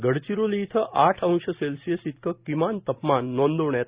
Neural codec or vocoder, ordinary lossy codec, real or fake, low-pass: none; Opus, 64 kbps; real; 3.6 kHz